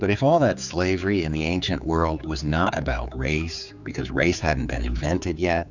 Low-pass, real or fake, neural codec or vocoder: 7.2 kHz; fake; codec, 16 kHz, 4 kbps, X-Codec, HuBERT features, trained on general audio